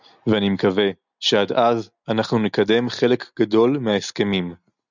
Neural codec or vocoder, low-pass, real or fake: none; 7.2 kHz; real